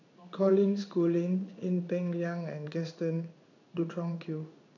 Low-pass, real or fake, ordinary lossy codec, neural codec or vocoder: 7.2 kHz; fake; none; codec, 16 kHz in and 24 kHz out, 1 kbps, XY-Tokenizer